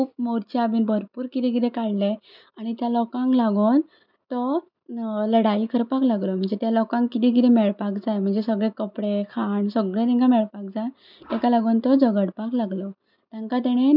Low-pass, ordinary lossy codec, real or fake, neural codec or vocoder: 5.4 kHz; none; real; none